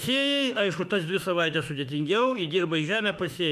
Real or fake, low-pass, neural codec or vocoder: fake; 14.4 kHz; autoencoder, 48 kHz, 32 numbers a frame, DAC-VAE, trained on Japanese speech